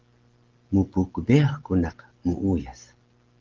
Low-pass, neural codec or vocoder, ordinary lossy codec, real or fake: 7.2 kHz; none; Opus, 16 kbps; real